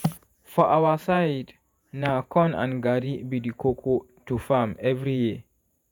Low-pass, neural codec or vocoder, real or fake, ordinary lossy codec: none; vocoder, 48 kHz, 128 mel bands, Vocos; fake; none